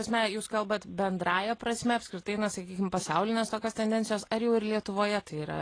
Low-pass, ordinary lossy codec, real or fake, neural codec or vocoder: 9.9 kHz; AAC, 32 kbps; real; none